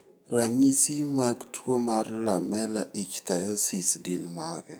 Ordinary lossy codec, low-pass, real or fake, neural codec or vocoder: none; none; fake; codec, 44.1 kHz, 2.6 kbps, SNAC